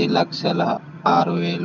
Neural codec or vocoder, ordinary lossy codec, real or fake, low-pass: vocoder, 22.05 kHz, 80 mel bands, HiFi-GAN; none; fake; 7.2 kHz